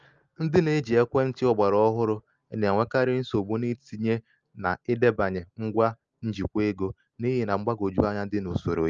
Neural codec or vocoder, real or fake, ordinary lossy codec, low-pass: none; real; Opus, 24 kbps; 7.2 kHz